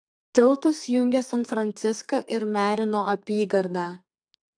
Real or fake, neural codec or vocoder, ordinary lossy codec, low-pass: fake; codec, 32 kHz, 1.9 kbps, SNAC; AAC, 64 kbps; 9.9 kHz